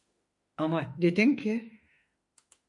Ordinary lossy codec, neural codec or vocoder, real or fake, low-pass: MP3, 48 kbps; autoencoder, 48 kHz, 32 numbers a frame, DAC-VAE, trained on Japanese speech; fake; 10.8 kHz